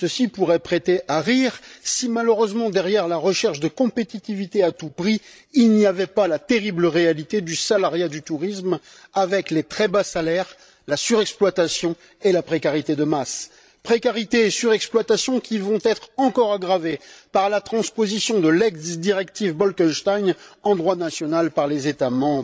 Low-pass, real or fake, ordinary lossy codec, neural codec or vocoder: none; fake; none; codec, 16 kHz, 16 kbps, FreqCodec, larger model